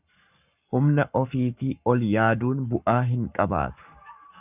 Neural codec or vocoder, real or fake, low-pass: codec, 44.1 kHz, 7.8 kbps, Pupu-Codec; fake; 3.6 kHz